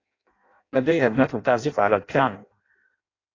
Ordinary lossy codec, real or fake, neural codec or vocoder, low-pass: AAC, 32 kbps; fake; codec, 16 kHz in and 24 kHz out, 0.6 kbps, FireRedTTS-2 codec; 7.2 kHz